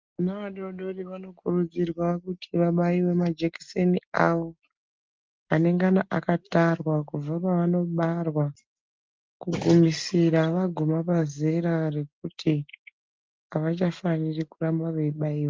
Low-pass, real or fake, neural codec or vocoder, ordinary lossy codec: 7.2 kHz; real; none; Opus, 32 kbps